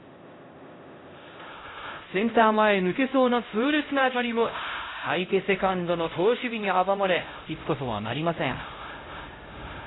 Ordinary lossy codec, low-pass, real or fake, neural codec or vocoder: AAC, 16 kbps; 7.2 kHz; fake; codec, 16 kHz, 0.5 kbps, X-Codec, HuBERT features, trained on LibriSpeech